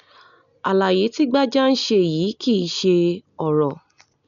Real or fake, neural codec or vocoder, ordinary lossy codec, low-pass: real; none; none; 7.2 kHz